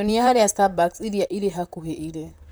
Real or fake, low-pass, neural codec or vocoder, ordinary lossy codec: fake; none; vocoder, 44.1 kHz, 128 mel bands, Pupu-Vocoder; none